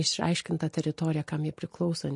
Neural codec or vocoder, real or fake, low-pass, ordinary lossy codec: none; real; 10.8 kHz; MP3, 48 kbps